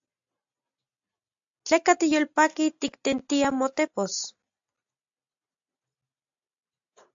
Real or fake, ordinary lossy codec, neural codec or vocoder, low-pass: real; AAC, 64 kbps; none; 7.2 kHz